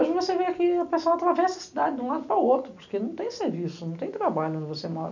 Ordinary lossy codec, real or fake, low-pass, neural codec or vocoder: none; real; 7.2 kHz; none